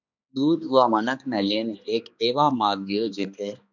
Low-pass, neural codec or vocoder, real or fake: 7.2 kHz; codec, 16 kHz, 2 kbps, X-Codec, HuBERT features, trained on balanced general audio; fake